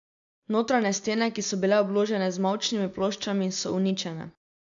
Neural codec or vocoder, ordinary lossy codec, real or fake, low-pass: none; AAC, 64 kbps; real; 7.2 kHz